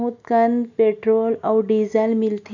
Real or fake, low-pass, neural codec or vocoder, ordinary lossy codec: real; 7.2 kHz; none; none